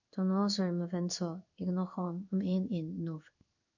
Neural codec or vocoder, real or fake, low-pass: codec, 16 kHz in and 24 kHz out, 1 kbps, XY-Tokenizer; fake; 7.2 kHz